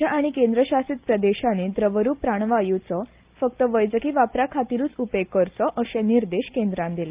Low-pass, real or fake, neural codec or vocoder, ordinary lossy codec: 3.6 kHz; real; none; Opus, 24 kbps